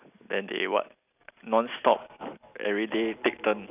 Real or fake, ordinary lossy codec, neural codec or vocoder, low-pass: real; none; none; 3.6 kHz